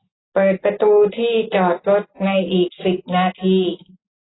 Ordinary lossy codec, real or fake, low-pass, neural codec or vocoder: AAC, 16 kbps; real; 7.2 kHz; none